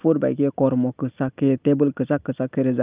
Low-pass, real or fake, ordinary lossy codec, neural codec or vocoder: 3.6 kHz; real; Opus, 24 kbps; none